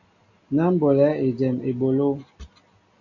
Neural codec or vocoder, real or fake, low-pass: none; real; 7.2 kHz